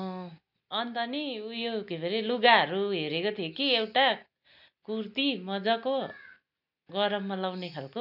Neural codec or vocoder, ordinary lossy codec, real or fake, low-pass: none; none; real; 5.4 kHz